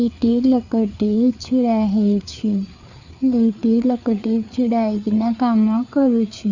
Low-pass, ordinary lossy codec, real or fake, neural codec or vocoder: 7.2 kHz; Opus, 64 kbps; fake; codec, 16 kHz, 4 kbps, FreqCodec, larger model